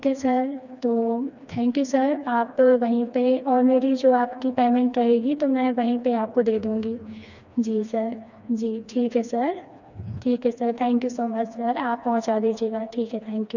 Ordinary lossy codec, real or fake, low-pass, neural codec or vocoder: none; fake; 7.2 kHz; codec, 16 kHz, 2 kbps, FreqCodec, smaller model